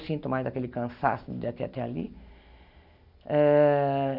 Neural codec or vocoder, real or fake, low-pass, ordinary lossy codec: none; real; 5.4 kHz; none